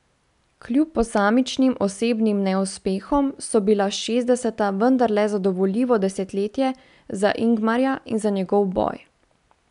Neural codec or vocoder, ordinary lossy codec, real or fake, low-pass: none; none; real; 10.8 kHz